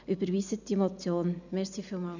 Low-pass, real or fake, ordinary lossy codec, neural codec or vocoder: 7.2 kHz; real; none; none